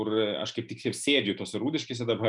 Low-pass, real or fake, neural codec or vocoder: 10.8 kHz; real; none